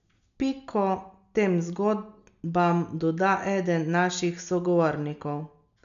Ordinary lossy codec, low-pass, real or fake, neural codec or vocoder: none; 7.2 kHz; real; none